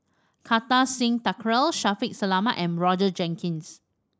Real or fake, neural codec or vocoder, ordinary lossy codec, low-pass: real; none; none; none